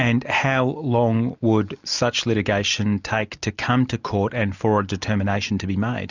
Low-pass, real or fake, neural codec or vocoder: 7.2 kHz; real; none